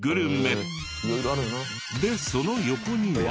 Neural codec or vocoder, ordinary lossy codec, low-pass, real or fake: none; none; none; real